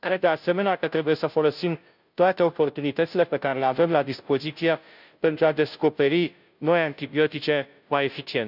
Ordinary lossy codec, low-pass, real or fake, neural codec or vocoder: none; 5.4 kHz; fake; codec, 16 kHz, 0.5 kbps, FunCodec, trained on Chinese and English, 25 frames a second